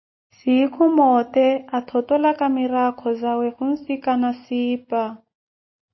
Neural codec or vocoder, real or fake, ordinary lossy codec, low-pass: none; real; MP3, 24 kbps; 7.2 kHz